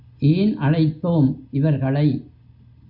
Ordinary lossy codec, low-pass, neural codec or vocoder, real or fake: Opus, 64 kbps; 5.4 kHz; none; real